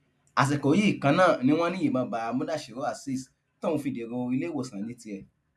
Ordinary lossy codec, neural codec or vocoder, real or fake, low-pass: none; none; real; none